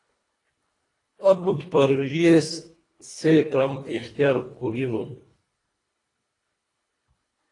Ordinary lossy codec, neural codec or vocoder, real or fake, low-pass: AAC, 32 kbps; codec, 24 kHz, 1.5 kbps, HILCodec; fake; 10.8 kHz